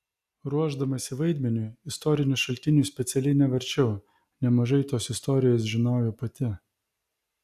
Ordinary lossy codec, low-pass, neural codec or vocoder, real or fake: AAC, 96 kbps; 14.4 kHz; none; real